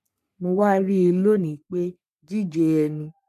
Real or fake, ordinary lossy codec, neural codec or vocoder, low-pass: fake; none; codec, 44.1 kHz, 3.4 kbps, Pupu-Codec; 14.4 kHz